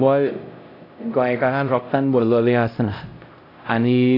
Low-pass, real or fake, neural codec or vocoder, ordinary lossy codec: 5.4 kHz; fake; codec, 16 kHz, 0.5 kbps, X-Codec, HuBERT features, trained on LibriSpeech; AAC, 32 kbps